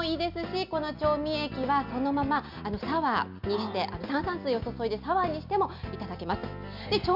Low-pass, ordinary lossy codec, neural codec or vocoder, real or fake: 5.4 kHz; MP3, 48 kbps; none; real